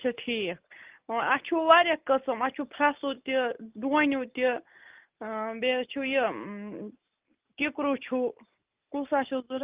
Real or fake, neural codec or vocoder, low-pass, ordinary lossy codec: real; none; 3.6 kHz; Opus, 32 kbps